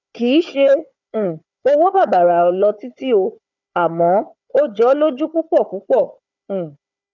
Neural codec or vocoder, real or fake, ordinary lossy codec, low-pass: codec, 16 kHz, 4 kbps, FunCodec, trained on Chinese and English, 50 frames a second; fake; none; 7.2 kHz